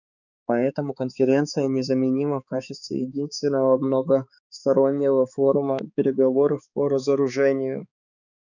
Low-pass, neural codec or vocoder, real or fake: 7.2 kHz; codec, 16 kHz, 4 kbps, X-Codec, HuBERT features, trained on balanced general audio; fake